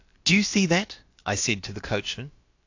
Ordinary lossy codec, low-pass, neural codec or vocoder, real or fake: AAC, 48 kbps; 7.2 kHz; codec, 16 kHz, about 1 kbps, DyCAST, with the encoder's durations; fake